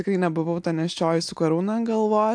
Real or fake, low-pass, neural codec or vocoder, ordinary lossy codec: real; 9.9 kHz; none; AAC, 64 kbps